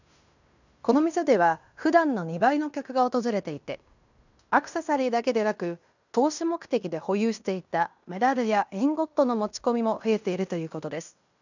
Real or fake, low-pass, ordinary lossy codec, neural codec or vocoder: fake; 7.2 kHz; none; codec, 16 kHz in and 24 kHz out, 0.9 kbps, LongCat-Audio-Codec, fine tuned four codebook decoder